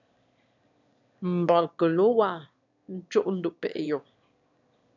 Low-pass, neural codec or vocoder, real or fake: 7.2 kHz; autoencoder, 22.05 kHz, a latent of 192 numbers a frame, VITS, trained on one speaker; fake